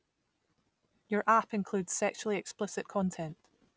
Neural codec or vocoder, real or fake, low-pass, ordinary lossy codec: none; real; none; none